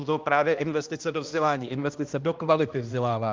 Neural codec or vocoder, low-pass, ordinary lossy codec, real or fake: codec, 16 kHz, 1 kbps, X-Codec, HuBERT features, trained on balanced general audio; 7.2 kHz; Opus, 32 kbps; fake